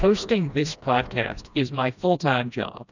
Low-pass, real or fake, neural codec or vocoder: 7.2 kHz; fake; codec, 16 kHz, 1 kbps, FreqCodec, smaller model